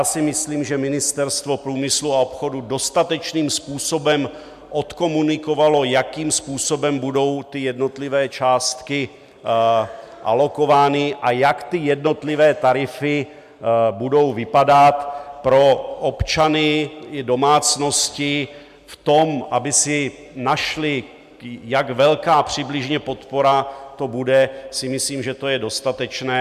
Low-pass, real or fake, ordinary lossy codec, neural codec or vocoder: 14.4 kHz; real; MP3, 96 kbps; none